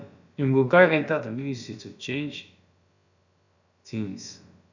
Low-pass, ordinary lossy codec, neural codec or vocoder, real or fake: 7.2 kHz; none; codec, 16 kHz, about 1 kbps, DyCAST, with the encoder's durations; fake